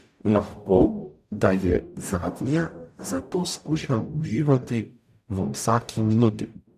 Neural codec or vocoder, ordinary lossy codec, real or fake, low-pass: codec, 44.1 kHz, 0.9 kbps, DAC; none; fake; 14.4 kHz